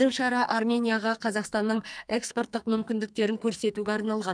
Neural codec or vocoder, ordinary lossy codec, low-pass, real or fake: codec, 44.1 kHz, 2.6 kbps, SNAC; none; 9.9 kHz; fake